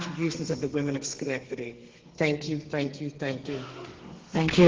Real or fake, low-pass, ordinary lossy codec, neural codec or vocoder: fake; 7.2 kHz; Opus, 16 kbps; codec, 32 kHz, 1.9 kbps, SNAC